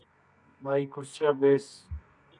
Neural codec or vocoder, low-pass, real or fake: codec, 24 kHz, 0.9 kbps, WavTokenizer, medium music audio release; 10.8 kHz; fake